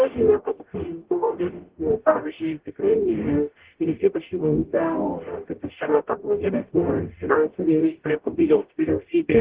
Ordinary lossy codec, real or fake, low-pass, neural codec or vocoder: Opus, 16 kbps; fake; 3.6 kHz; codec, 44.1 kHz, 0.9 kbps, DAC